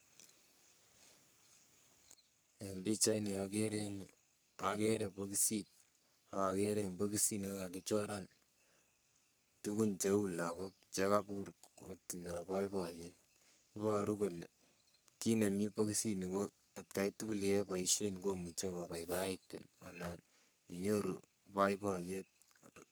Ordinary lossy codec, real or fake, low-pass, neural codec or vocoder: none; fake; none; codec, 44.1 kHz, 3.4 kbps, Pupu-Codec